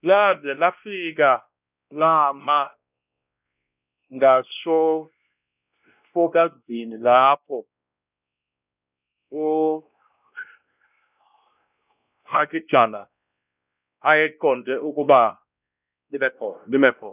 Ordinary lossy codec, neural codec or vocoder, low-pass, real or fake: none; codec, 16 kHz, 1 kbps, X-Codec, WavLM features, trained on Multilingual LibriSpeech; 3.6 kHz; fake